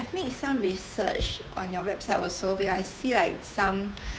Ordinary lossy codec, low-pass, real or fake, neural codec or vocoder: none; none; fake; codec, 16 kHz, 2 kbps, FunCodec, trained on Chinese and English, 25 frames a second